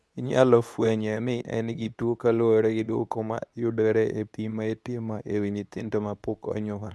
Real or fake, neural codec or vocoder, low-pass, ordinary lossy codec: fake; codec, 24 kHz, 0.9 kbps, WavTokenizer, medium speech release version 2; none; none